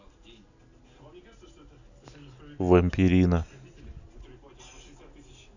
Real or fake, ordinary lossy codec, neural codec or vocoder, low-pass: real; none; none; 7.2 kHz